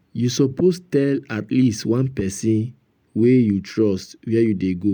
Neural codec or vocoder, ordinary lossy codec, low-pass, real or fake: none; none; none; real